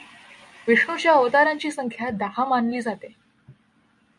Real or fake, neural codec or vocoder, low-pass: real; none; 10.8 kHz